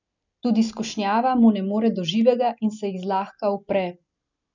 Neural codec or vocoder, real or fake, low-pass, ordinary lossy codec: none; real; 7.2 kHz; none